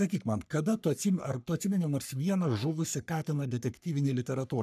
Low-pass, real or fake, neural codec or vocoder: 14.4 kHz; fake; codec, 44.1 kHz, 3.4 kbps, Pupu-Codec